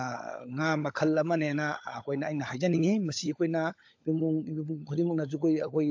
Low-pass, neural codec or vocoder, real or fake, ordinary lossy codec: 7.2 kHz; codec, 16 kHz, 16 kbps, FunCodec, trained on LibriTTS, 50 frames a second; fake; AAC, 48 kbps